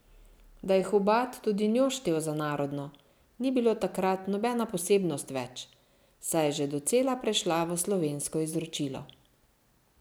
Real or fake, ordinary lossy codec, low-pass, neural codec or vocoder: real; none; none; none